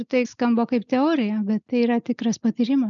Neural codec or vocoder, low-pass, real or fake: none; 7.2 kHz; real